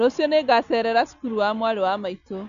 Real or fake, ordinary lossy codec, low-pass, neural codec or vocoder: real; none; 7.2 kHz; none